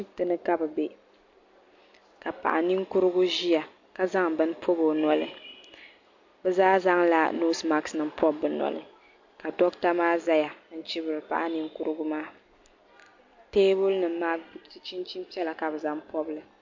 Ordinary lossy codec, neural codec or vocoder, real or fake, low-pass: MP3, 48 kbps; none; real; 7.2 kHz